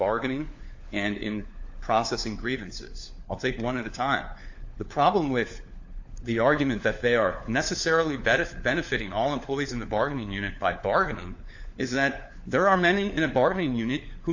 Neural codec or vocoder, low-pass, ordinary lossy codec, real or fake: codec, 16 kHz, 4 kbps, FunCodec, trained on LibriTTS, 50 frames a second; 7.2 kHz; AAC, 48 kbps; fake